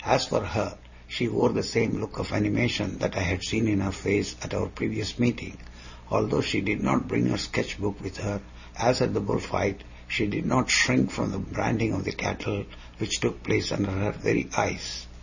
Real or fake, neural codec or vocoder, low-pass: real; none; 7.2 kHz